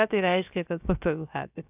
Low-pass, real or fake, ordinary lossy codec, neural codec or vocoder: 3.6 kHz; fake; AAC, 32 kbps; codec, 16 kHz, about 1 kbps, DyCAST, with the encoder's durations